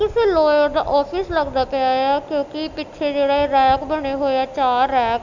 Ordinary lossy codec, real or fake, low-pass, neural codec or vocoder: none; real; 7.2 kHz; none